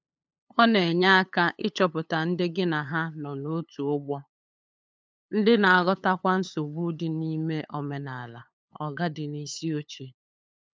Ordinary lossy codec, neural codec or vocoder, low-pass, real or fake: none; codec, 16 kHz, 8 kbps, FunCodec, trained on LibriTTS, 25 frames a second; none; fake